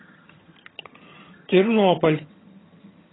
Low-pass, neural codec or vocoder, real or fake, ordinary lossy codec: 7.2 kHz; vocoder, 22.05 kHz, 80 mel bands, HiFi-GAN; fake; AAC, 16 kbps